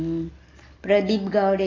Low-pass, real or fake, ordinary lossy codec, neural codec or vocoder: 7.2 kHz; real; AAC, 32 kbps; none